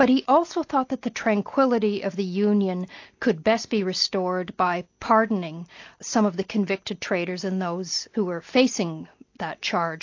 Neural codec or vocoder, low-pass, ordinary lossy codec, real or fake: none; 7.2 kHz; AAC, 48 kbps; real